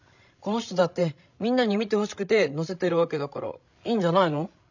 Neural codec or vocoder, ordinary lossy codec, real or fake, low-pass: codec, 16 kHz, 16 kbps, FreqCodec, larger model; none; fake; 7.2 kHz